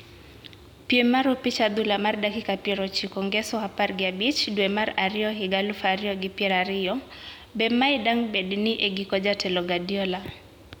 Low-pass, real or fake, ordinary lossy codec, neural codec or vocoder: 19.8 kHz; real; none; none